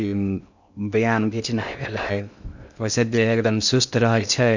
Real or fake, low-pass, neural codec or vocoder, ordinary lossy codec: fake; 7.2 kHz; codec, 16 kHz in and 24 kHz out, 0.6 kbps, FocalCodec, streaming, 2048 codes; none